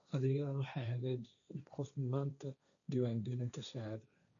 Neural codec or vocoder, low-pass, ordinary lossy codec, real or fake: codec, 16 kHz, 1.1 kbps, Voila-Tokenizer; 7.2 kHz; none; fake